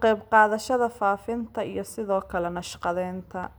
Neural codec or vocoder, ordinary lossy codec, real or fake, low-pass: none; none; real; none